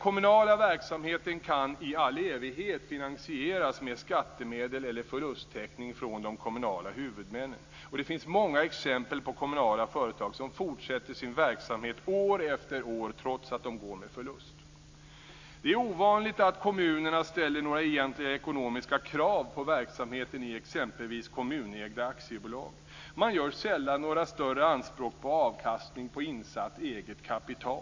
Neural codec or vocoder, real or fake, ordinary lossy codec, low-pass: none; real; AAC, 48 kbps; 7.2 kHz